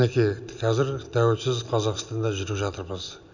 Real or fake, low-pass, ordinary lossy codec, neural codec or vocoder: real; 7.2 kHz; none; none